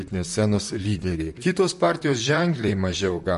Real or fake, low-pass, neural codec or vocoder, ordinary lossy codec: fake; 14.4 kHz; vocoder, 44.1 kHz, 128 mel bands, Pupu-Vocoder; MP3, 48 kbps